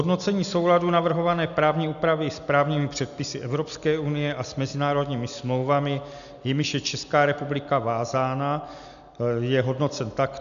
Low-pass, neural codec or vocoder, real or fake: 7.2 kHz; none; real